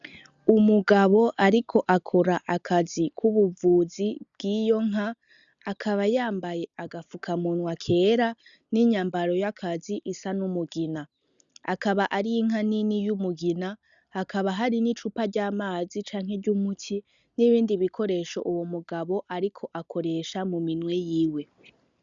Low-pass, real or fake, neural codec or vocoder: 7.2 kHz; real; none